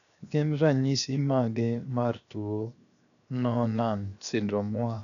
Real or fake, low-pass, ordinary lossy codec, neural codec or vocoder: fake; 7.2 kHz; none; codec, 16 kHz, 0.7 kbps, FocalCodec